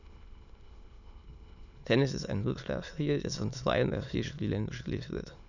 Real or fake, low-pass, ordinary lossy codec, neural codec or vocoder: fake; 7.2 kHz; none; autoencoder, 22.05 kHz, a latent of 192 numbers a frame, VITS, trained on many speakers